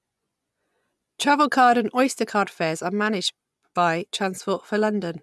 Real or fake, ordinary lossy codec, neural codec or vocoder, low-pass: fake; none; vocoder, 24 kHz, 100 mel bands, Vocos; none